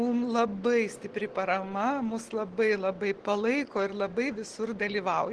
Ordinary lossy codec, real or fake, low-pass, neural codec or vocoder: Opus, 16 kbps; real; 10.8 kHz; none